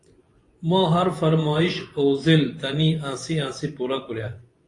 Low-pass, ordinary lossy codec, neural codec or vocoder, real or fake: 10.8 kHz; AAC, 48 kbps; vocoder, 24 kHz, 100 mel bands, Vocos; fake